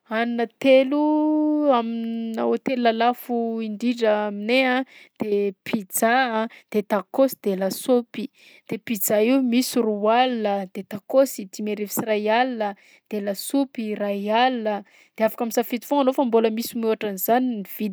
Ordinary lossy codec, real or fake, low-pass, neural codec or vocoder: none; real; none; none